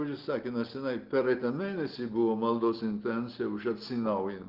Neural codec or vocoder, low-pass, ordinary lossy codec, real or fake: none; 5.4 kHz; Opus, 16 kbps; real